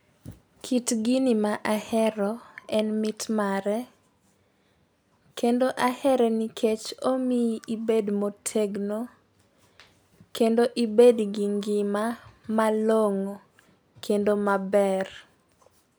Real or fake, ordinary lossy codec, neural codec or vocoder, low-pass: real; none; none; none